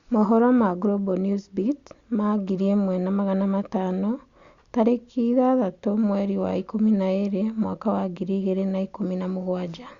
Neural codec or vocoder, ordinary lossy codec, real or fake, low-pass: none; Opus, 64 kbps; real; 7.2 kHz